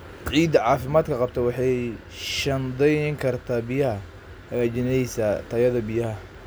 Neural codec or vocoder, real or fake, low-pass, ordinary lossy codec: none; real; none; none